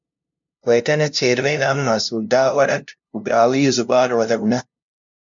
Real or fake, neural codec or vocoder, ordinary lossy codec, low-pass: fake; codec, 16 kHz, 0.5 kbps, FunCodec, trained on LibriTTS, 25 frames a second; MP3, 64 kbps; 7.2 kHz